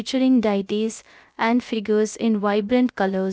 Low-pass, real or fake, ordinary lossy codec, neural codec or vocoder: none; fake; none; codec, 16 kHz, 0.3 kbps, FocalCodec